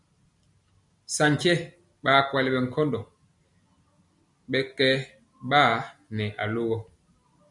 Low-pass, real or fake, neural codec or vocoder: 10.8 kHz; real; none